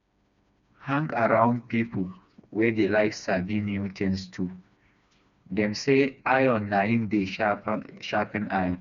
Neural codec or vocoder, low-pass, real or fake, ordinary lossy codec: codec, 16 kHz, 2 kbps, FreqCodec, smaller model; 7.2 kHz; fake; none